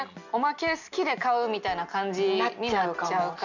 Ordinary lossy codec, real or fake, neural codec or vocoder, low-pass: none; real; none; 7.2 kHz